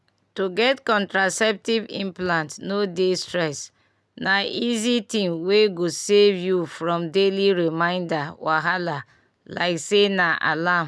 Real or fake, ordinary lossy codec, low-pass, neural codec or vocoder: real; none; none; none